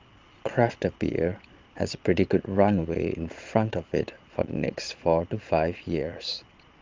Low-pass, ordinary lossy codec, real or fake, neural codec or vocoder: 7.2 kHz; Opus, 32 kbps; fake; vocoder, 22.05 kHz, 80 mel bands, Vocos